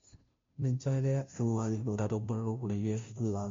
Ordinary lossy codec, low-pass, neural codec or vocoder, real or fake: MP3, 48 kbps; 7.2 kHz; codec, 16 kHz, 0.5 kbps, FunCodec, trained on Chinese and English, 25 frames a second; fake